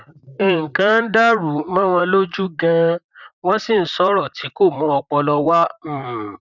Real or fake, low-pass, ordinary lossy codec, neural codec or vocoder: fake; 7.2 kHz; none; vocoder, 44.1 kHz, 128 mel bands, Pupu-Vocoder